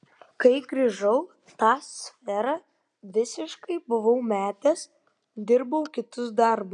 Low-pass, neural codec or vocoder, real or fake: 9.9 kHz; none; real